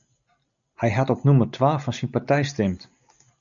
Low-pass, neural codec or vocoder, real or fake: 7.2 kHz; none; real